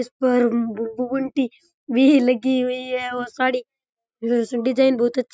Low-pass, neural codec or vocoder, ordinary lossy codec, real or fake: none; none; none; real